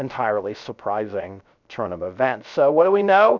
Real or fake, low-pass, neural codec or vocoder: fake; 7.2 kHz; codec, 16 kHz, 0.3 kbps, FocalCodec